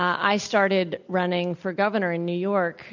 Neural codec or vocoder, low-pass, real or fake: none; 7.2 kHz; real